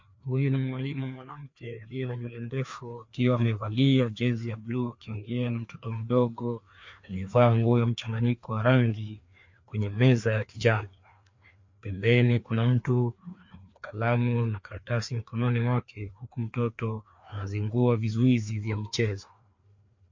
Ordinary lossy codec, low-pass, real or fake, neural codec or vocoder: MP3, 48 kbps; 7.2 kHz; fake; codec, 16 kHz, 2 kbps, FreqCodec, larger model